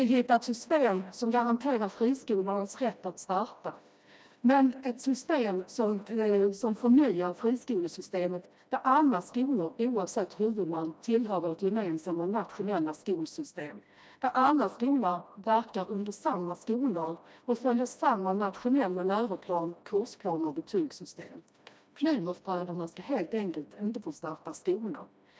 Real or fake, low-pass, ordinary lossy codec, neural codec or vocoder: fake; none; none; codec, 16 kHz, 1 kbps, FreqCodec, smaller model